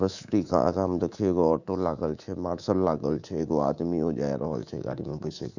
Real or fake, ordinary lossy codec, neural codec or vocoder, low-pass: fake; none; codec, 24 kHz, 3.1 kbps, DualCodec; 7.2 kHz